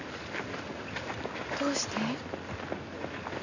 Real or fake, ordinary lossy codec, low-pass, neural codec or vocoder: real; none; 7.2 kHz; none